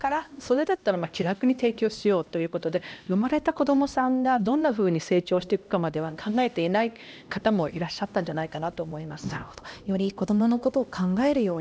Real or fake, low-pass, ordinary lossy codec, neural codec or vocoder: fake; none; none; codec, 16 kHz, 1 kbps, X-Codec, HuBERT features, trained on LibriSpeech